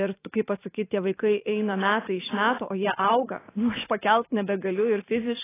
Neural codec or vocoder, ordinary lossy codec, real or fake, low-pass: vocoder, 44.1 kHz, 128 mel bands every 512 samples, BigVGAN v2; AAC, 16 kbps; fake; 3.6 kHz